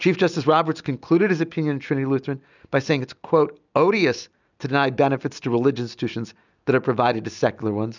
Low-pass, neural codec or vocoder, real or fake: 7.2 kHz; none; real